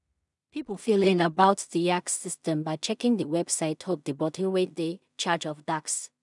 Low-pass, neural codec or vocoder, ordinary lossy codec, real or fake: 10.8 kHz; codec, 16 kHz in and 24 kHz out, 0.4 kbps, LongCat-Audio-Codec, two codebook decoder; none; fake